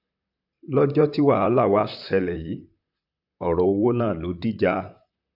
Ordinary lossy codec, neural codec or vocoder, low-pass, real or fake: AAC, 48 kbps; vocoder, 44.1 kHz, 128 mel bands, Pupu-Vocoder; 5.4 kHz; fake